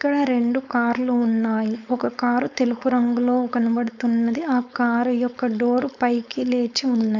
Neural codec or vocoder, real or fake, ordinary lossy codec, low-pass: codec, 16 kHz, 4.8 kbps, FACodec; fake; none; 7.2 kHz